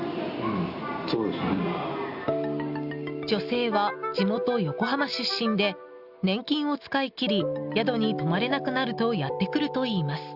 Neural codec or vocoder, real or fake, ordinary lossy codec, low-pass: none; real; Opus, 64 kbps; 5.4 kHz